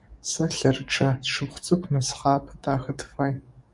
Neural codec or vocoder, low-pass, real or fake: codec, 44.1 kHz, 7.8 kbps, DAC; 10.8 kHz; fake